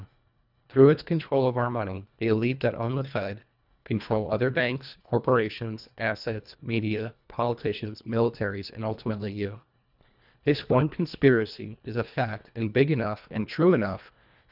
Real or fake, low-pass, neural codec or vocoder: fake; 5.4 kHz; codec, 24 kHz, 1.5 kbps, HILCodec